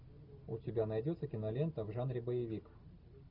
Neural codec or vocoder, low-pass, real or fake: none; 5.4 kHz; real